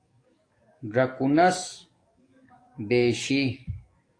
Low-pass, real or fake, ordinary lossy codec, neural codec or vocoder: 9.9 kHz; fake; AAC, 48 kbps; codec, 44.1 kHz, 7.8 kbps, DAC